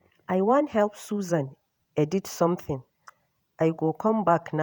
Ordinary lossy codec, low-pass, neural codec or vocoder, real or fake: none; none; vocoder, 48 kHz, 128 mel bands, Vocos; fake